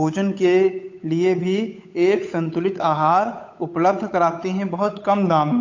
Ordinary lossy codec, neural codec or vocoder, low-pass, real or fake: AAC, 48 kbps; codec, 16 kHz, 8 kbps, FunCodec, trained on Chinese and English, 25 frames a second; 7.2 kHz; fake